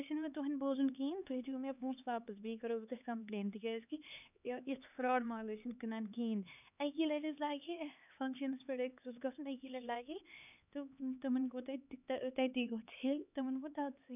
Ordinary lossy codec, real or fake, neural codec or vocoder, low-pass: none; fake; codec, 16 kHz, 4 kbps, X-Codec, HuBERT features, trained on LibriSpeech; 3.6 kHz